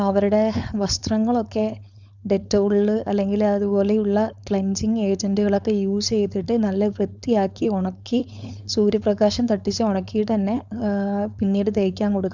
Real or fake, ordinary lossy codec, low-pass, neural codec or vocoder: fake; none; 7.2 kHz; codec, 16 kHz, 4.8 kbps, FACodec